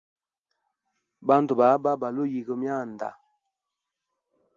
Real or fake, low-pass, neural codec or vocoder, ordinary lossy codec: real; 7.2 kHz; none; Opus, 32 kbps